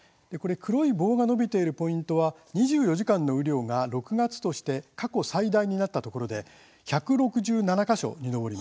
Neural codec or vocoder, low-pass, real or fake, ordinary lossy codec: none; none; real; none